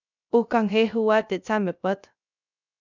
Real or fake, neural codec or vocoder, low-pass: fake; codec, 16 kHz, 0.3 kbps, FocalCodec; 7.2 kHz